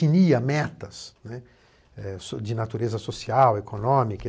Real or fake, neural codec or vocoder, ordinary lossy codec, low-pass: real; none; none; none